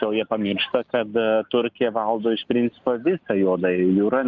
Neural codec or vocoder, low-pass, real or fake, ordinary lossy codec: none; 7.2 kHz; real; Opus, 24 kbps